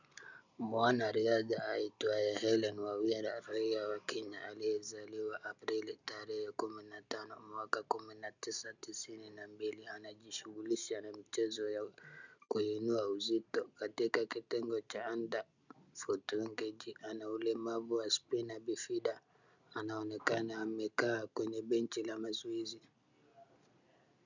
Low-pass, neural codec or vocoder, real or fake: 7.2 kHz; none; real